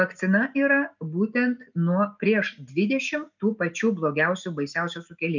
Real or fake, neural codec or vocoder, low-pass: real; none; 7.2 kHz